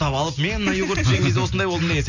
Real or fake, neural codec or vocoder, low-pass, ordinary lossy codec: real; none; 7.2 kHz; none